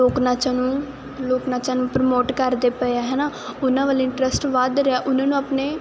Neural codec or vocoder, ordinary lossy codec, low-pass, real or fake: none; none; none; real